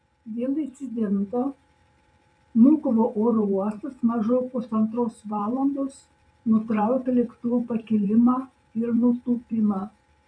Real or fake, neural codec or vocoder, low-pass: fake; vocoder, 44.1 kHz, 128 mel bands every 512 samples, BigVGAN v2; 9.9 kHz